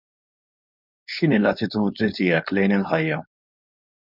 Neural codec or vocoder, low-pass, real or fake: codec, 16 kHz, 4.8 kbps, FACodec; 5.4 kHz; fake